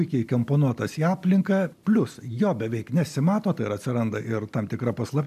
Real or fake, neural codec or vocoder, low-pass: real; none; 14.4 kHz